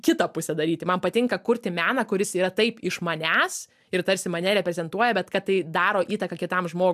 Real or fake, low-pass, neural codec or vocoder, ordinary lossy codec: real; 14.4 kHz; none; AAC, 96 kbps